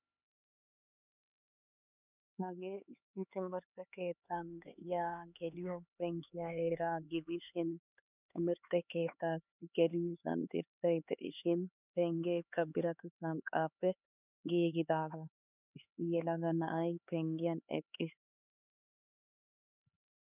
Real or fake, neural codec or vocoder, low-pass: fake; codec, 16 kHz, 4 kbps, X-Codec, HuBERT features, trained on LibriSpeech; 3.6 kHz